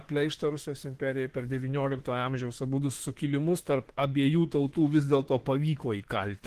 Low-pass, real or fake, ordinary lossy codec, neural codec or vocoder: 14.4 kHz; fake; Opus, 16 kbps; autoencoder, 48 kHz, 32 numbers a frame, DAC-VAE, trained on Japanese speech